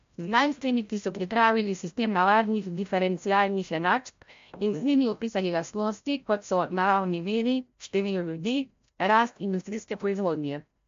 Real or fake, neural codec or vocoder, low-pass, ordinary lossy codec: fake; codec, 16 kHz, 0.5 kbps, FreqCodec, larger model; 7.2 kHz; MP3, 48 kbps